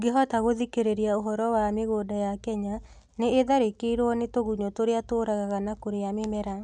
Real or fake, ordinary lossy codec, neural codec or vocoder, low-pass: real; none; none; 9.9 kHz